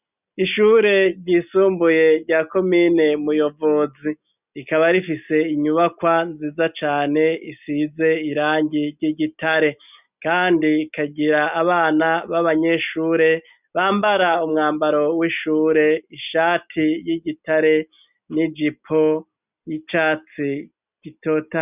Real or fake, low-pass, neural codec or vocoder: real; 3.6 kHz; none